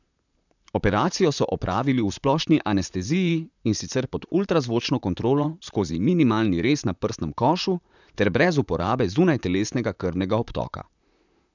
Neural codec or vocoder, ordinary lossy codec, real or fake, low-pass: vocoder, 44.1 kHz, 128 mel bands, Pupu-Vocoder; none; fake; 7.2 kHz